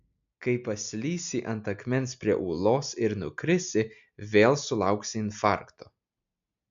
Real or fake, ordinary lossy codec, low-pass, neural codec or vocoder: real; MP3, 64 kbps; 7.2 kHz; none